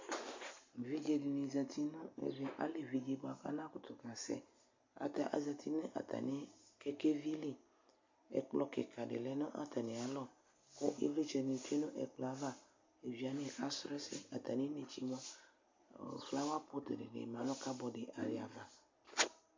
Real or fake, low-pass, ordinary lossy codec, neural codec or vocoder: real; 7.2 kHz; MP3, 48 kbps; none